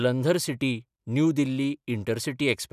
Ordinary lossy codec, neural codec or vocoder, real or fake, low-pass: none; none; real; 14.4 kHz